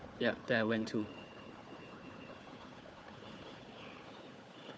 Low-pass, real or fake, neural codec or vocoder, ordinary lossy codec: none; fake; codec, 16 kHz, 4 kbps, FunCodec, trained on Chinese and English, 50 frames a second; none